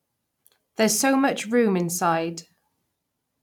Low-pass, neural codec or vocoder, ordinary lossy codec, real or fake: 19.8 kHz; vocoder, 44.1 kHz, 128 mel bands every 512 samples, BigVGAN v2; none; fake